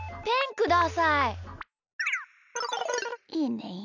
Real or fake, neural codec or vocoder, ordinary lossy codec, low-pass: real; none; none; 7.2 kHz